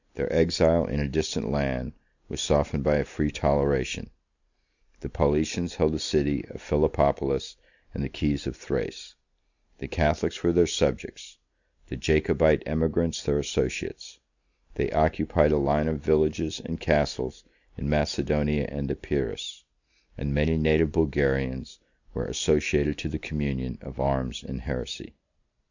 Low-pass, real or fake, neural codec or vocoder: 7.2 kHz; real; none